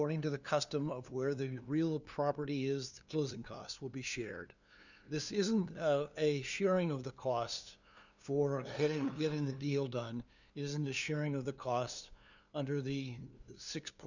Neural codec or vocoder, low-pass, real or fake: codec, 16 kHz, 2 kbps, FunCodec, trained on LibriTTS, 25 frames a second; 7.2 kHz; fake